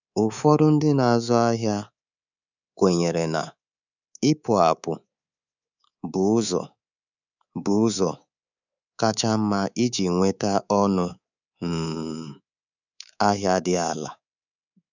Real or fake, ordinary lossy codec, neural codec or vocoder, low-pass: fake; none; codec, 24 kHz, 3.1 kbps, DualCodec; 7.2 kHz